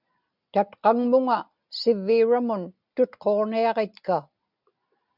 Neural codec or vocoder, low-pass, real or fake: none; 5.4 kHz; real